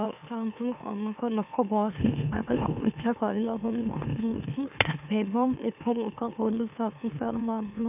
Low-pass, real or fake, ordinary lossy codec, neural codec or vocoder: 3.6 kHz; fake; none; autoencoder, 44.1 kHz, a latent of 192 numbers a frame, MeloTTS